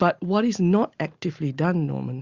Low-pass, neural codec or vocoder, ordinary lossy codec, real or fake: 7.2 kHz; none; Opus, 64 kbps; real